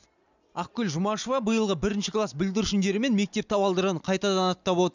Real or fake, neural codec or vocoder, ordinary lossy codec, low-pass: real; none; none; 7.2 kHz